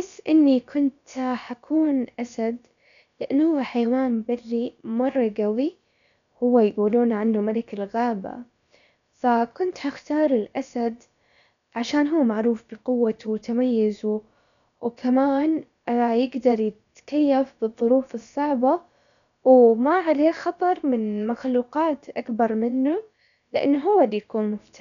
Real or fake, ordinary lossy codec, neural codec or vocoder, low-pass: fake; none; codec, 16 kHz, about 1 kbps, DyCAST, with the encoder's durations; 7.2 kHz